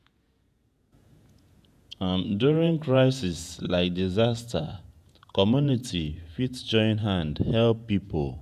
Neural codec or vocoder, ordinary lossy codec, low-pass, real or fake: vocoder, 48 kHz, 128 mel bands, Vocos; none; 14.4 kHz; fake